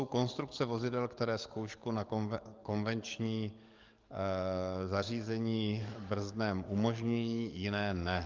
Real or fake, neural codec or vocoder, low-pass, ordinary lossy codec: fake; codec, 44.1 kHz, 7.8 kbps, DAC; 7.2 kHz; Opus, 32 kbps